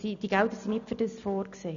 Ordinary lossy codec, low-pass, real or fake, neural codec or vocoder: none; 7.2 kHz; real; none